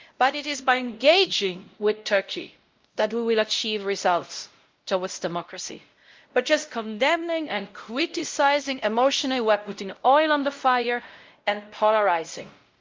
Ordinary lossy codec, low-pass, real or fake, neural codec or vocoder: Opus, 32 kbps; 7.2 kHz; fake; codec, 16 kHz, 0.5 kbps, X-Codec, WavLM features, trained on Multilingual LibriSpeech